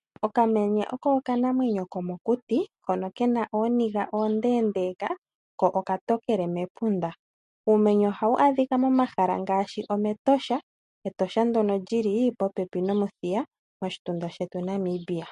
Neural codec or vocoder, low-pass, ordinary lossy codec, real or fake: none; 14.4 kHz; MP3, 48 kbps; real